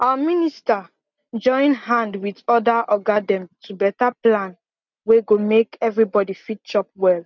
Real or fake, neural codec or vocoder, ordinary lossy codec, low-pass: real; none; none; 7.2 kHz